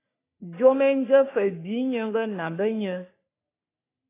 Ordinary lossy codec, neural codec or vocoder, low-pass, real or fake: AAC, 24 kbps; codec, 44.1 kHz, 3.4 kbps, Pupu-Codec; 3.6 kHz; fake